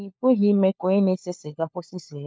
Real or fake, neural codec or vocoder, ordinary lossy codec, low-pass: fake; codec, 16 kHz, 4 kbps, FunCodec, trained on LibriTTS, 50 frames a second; none; none